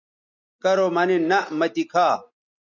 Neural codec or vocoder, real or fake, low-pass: none; real; 7.2 kHz